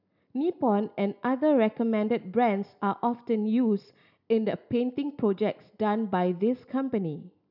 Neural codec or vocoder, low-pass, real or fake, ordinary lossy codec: none; 5.4 kHz; real; none